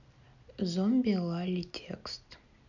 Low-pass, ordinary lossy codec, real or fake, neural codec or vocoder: 7.2 kHz; none; real; none